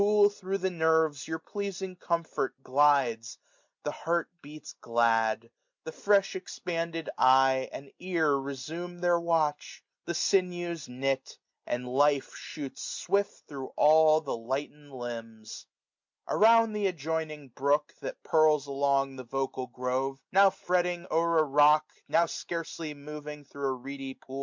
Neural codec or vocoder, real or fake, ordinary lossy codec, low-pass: none; real; MP3, 64 kbps; 7.2 kHz